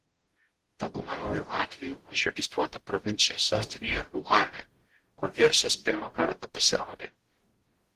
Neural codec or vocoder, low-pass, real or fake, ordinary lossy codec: codec, 44.1 kHz, 0.9 kbps, DAC; 14.4 kHz; fake; Opus, 16 kbps